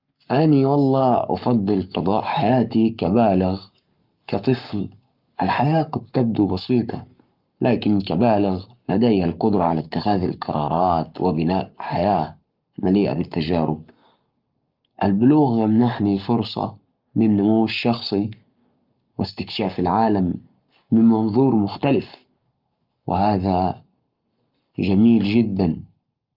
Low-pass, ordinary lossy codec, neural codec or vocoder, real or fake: 5.4 kHz; Opus, 24 kbps; codec, 44.1 kHz, 7.8 kbps, Pupu-Codec; fake